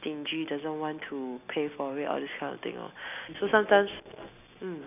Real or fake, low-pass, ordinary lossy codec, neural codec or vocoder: real; 3.6 kHz; none; none